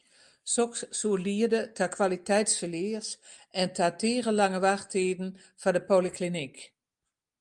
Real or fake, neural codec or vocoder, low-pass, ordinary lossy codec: real; none; 10.8 kHz; Opus, 32 kbps